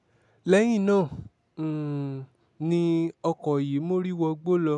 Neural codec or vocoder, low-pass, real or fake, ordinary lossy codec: none; 10.8 kHz; real; none